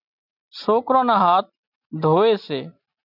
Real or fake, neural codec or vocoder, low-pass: real; none; 5.4 kHz